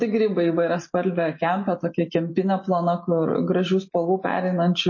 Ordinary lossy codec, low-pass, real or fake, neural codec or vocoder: MP3, 32 kbps; 7.2 kHz; real; none